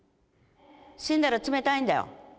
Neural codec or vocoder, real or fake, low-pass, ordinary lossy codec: none; real; none; none